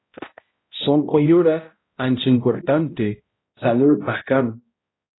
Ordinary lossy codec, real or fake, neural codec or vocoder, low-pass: AAC, 16 kbps; fake; codec, 16 kHz, 0.5 kbps, X-Codec, HuBERT features, trained on balanced general audio; 7.2 kHz